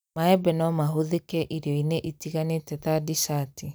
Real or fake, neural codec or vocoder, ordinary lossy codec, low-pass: real; none; none; none